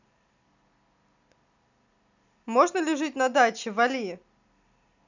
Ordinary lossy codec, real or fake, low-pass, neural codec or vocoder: none; real; 7.2 kHz; none